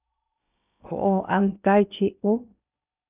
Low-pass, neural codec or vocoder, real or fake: 3.6 kHz; codec, 16 kHz in and 24 kHz out, 0.8 kbps, FocalCodec, streaming, 65536 codes; fake